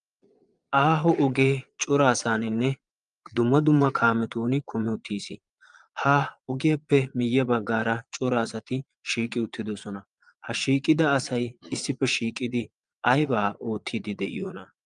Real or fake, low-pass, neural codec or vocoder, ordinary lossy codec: fake; 9.9 kHz; vocoder, 22.05 kHz, 80 mel bands, Vocos; Opus, 32 kbps